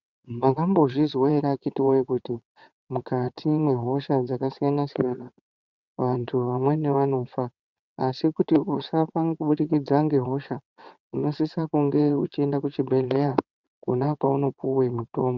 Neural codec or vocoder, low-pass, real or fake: vocoder, 22.05 kHz, 80 mel bands, WaveNeXt; 7.2 kHz; fake